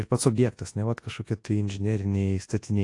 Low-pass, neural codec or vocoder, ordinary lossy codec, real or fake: 10.8 kHz; codec, 24 kHz, 0.9 kbps, WavTokenizer, large speech release; AAC, 48 kbps; fake